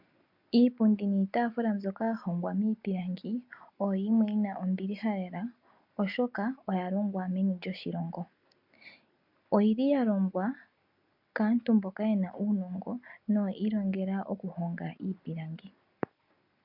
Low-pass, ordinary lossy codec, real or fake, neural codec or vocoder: 5.4 kHz; MP3, 48 kbps; real; none